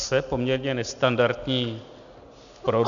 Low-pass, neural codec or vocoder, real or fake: 7.2 kHz; none; real